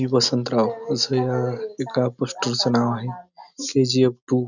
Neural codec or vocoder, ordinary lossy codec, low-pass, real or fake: none; none; 7.2 kHz; real